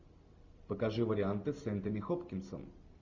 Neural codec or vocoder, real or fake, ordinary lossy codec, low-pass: none; real; Opus, 64 kbps; 7.2 kHz